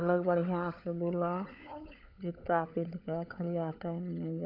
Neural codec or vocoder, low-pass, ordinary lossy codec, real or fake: codec, 16 kHz, 16 kbps, FunCodec, trained on LibriTTS, 50 frames a second; 5.4 kHz; none; fake